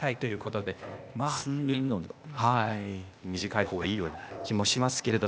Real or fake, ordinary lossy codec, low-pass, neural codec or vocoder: fake; none; none; codec, 16 kHz, 0.8 kbps, ZipCodec